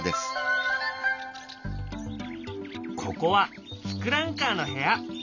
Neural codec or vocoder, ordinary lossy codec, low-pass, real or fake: none; none; 7.2 kHz; real